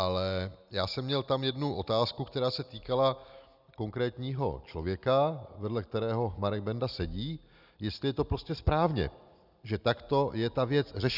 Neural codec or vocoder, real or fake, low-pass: none; real; 5.4 kHz